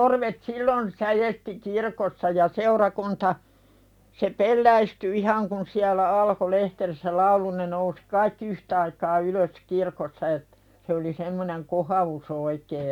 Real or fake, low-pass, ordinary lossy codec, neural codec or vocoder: real; 19.8 kHz; none; none